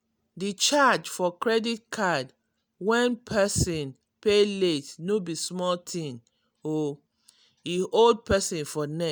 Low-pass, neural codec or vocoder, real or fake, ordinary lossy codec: none; none; real; none